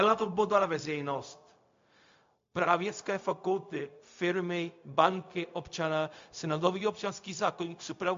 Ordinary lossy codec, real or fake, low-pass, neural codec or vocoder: MP3, 48 kbps; fake; 7.2 kHz; codec, 16 kHz, 0.4 kbps, LongCat-Audio-Codec